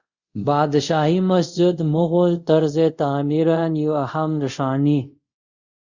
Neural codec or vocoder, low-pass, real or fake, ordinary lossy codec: codec, 24 kHz, 0.5 kbps, DualCodec; 7.2 kHz; fake; Opus, 64 kbps